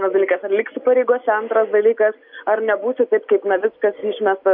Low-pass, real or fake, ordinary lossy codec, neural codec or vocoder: 5.4 kHz; real; MP3, 48 kbps; none